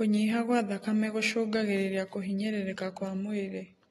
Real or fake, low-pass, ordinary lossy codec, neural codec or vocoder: real; 19.8 kHz; AAC, 32 kbps; none